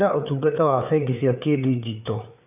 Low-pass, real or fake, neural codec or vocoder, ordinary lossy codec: 3.6 kHz; fake; codec, 16 kHz, 4 kbps, FreqCodec, larger model; none